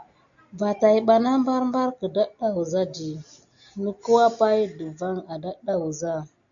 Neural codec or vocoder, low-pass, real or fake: none; 7.2 kHz; real